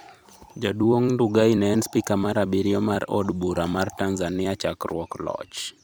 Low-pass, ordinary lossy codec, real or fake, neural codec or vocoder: none; none; fake; vocoder, 44.1 kHz, 128 mel bands every 256 samples, BigVGAN v2